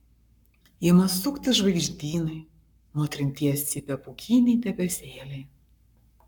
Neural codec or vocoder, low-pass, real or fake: codec, 44.1 kHz, 7.8 kbps, Pupu-Codec; 19.8 kHz; fake